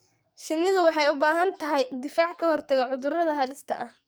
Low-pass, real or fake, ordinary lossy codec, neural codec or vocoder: none; fake; none; codec, 44.1 kHz, 2.6 kbps, SNAC